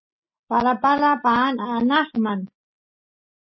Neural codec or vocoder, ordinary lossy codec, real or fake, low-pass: none; MP3, 24 kbps; real; 7.2 kHz